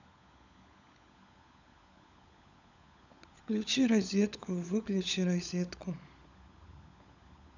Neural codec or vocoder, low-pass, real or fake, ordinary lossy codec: codec, 16 kHz, 16 kbps, FunCodec, trained on LibriTTS, 50 frames a second; 7.2 kHz; fake; none